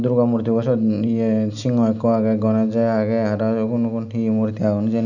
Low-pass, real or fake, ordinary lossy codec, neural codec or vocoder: 7.2 kHz; real; none; none